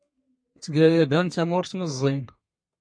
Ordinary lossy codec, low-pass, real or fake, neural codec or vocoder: MP3, 48 kbps; 9.9 kHz; fake; codec, 32 kHz, 1.9 kbps, SNAC